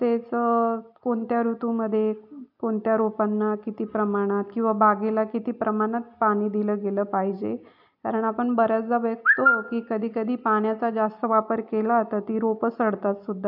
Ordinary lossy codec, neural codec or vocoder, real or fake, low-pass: AAC, 48 kbps; none; real; 5.4 kHz